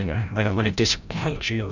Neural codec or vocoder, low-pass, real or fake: codec, 16 kHz, 0.5 kbps, FreqCodec, larger model; 7.2 kHz; fake